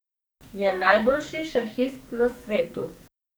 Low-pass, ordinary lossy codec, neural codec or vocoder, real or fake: none; none; codec, 44.1 kHz, 2.6 kbps, SNAC; fake